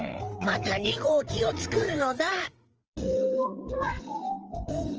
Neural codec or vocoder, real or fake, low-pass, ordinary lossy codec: codec, 16 kHz, 4 kbps, FreqCodec, larger model; fake; 7.2 kHz; Opus, 24 kbps